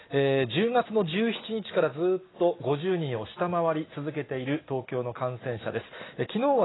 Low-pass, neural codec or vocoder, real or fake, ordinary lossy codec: 7.2 kHz; none; real; AAC, 16 kbps